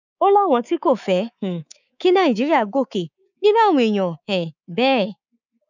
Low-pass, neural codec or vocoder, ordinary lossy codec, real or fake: 7.2 kHz; codec, 24 kHz, 3.1 kbps, DualCodec; none; fake